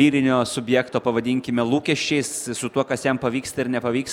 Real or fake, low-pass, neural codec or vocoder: real; 19.8 kHz; none